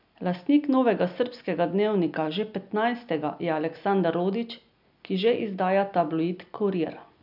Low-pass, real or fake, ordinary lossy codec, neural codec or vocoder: 5.4 kHz; real; none; none